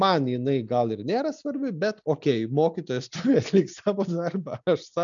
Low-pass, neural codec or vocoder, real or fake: 7.2 kHz; none; real